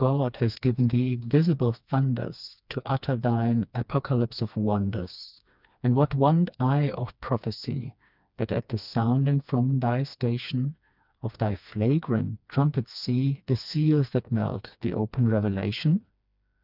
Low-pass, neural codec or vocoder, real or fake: 5.4 kHz; codec, 16 kHz, 2 kbps, FreqCodec, smaller model; fake